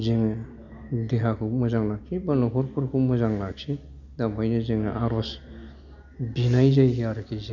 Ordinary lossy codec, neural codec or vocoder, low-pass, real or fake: none; none; 7.2 kHz; real